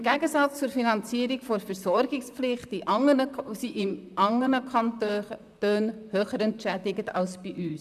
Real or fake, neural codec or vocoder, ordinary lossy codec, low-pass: fake; vocoder, 44.1 kHz, 128 mel bands, Pupu-Vocoder; none; 14.4 kHz